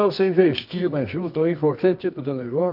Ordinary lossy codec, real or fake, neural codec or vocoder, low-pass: none; fake; codec, 24 kHz, 0.9 kbps, WavTokenizer, medium music audio release; 5.4 kHz